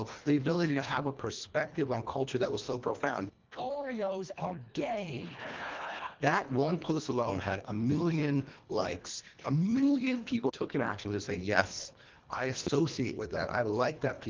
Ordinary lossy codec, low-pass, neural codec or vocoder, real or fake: Opus, 32 kbps; 7.2 kHz; codec, 24 kHz, 1.5 kbps, HILCodec; fake